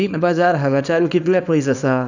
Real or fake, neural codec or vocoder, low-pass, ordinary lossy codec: fake; codec, 24 kHz, 0.9 kbps, WavTokenizer, small release; 7.2 kHz; none